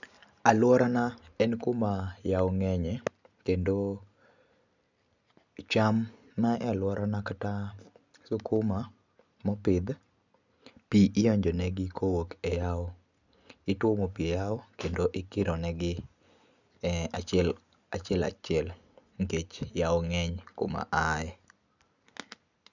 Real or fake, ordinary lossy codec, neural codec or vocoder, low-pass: real; none; none; 7.2 kHz